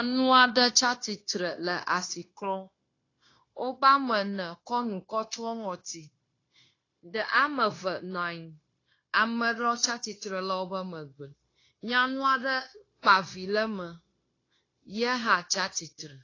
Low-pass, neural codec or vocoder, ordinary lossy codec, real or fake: 7.2 kHz; codec, 16 kHz, 0.9 kbps, LongCat-Audio-Codec; AAC, 32 kbps; fake